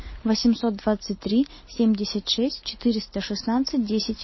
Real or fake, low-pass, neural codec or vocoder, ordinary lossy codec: real; 7.2 kHz; none; MP3, 24 kbps